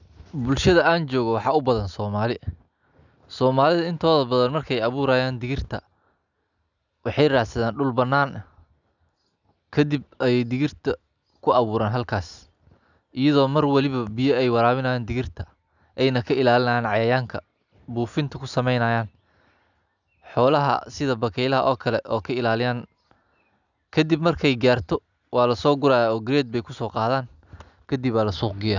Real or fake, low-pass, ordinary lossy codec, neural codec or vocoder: real; 7.2 kHz; none; none